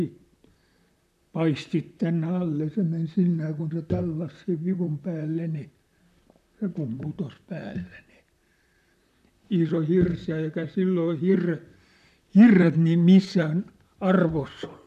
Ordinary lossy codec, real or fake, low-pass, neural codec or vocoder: none; fake; 14.4 kHz; vocoder, 44.1 kHz, 128 mel bands, Pupu-Vocoder